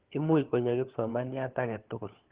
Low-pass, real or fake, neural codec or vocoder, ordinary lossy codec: 3.6 kHz; fake; codec, 16 kHz, 4 kbps, FunCodec, trained on LibriTTS, 50 frames a second; Opus, 16 kbps